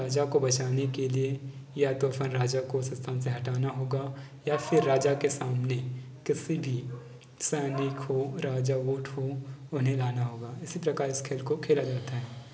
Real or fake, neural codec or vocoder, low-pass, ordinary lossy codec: real; none; none; none